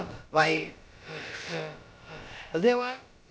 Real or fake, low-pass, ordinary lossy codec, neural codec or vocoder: fake; none; none; codec, 16 kHz, about 1 kbps, DyCAST, with the encoder's durations